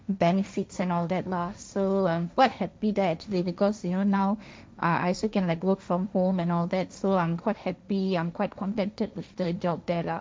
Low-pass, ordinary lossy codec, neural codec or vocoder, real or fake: none; none; codec, 16 kHz, 1.1 kbps, Voila-Tokenizer; fake